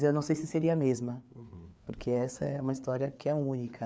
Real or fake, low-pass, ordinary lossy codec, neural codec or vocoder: fake; none; none; codec, 16 kHz, 4 kbps, FreqCodec, larger model